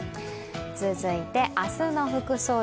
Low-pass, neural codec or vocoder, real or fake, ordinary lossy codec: none; none; real; none